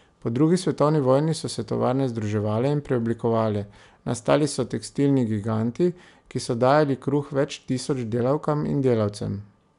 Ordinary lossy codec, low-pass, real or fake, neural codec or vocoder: none; 10.8 kHz; real; none